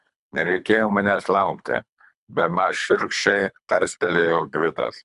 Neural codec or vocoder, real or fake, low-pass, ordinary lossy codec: codec, 24 kHz, 3 kbps, HILCodec; fake; 10.8 kHz; MP3, 96 kbps